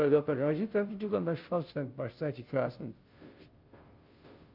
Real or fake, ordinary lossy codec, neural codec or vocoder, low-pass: fake; Opus, 24 kbps; codec, 16 kHz, 0.5 kbps, FunCodec, trained on Chinese and English, 25 frames a second; 5.4 kHz